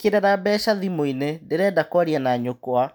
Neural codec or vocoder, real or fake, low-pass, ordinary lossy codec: none; real; none; none